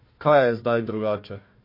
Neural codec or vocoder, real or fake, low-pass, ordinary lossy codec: codec, 16 kHz, 1 kbps, FunCodec, trained on Chinese and English, 50 frames a second; fake; 5.4 kHz; MP3, 32 kbps